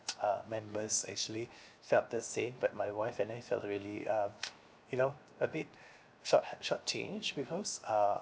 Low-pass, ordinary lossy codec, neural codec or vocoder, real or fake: none; none; codec, 16 kHz, 0.7 kbps, FocalCodec; fake